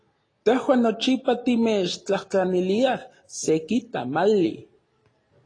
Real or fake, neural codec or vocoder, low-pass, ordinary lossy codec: real; none; 9.9 kHz; AAC, 32 kbps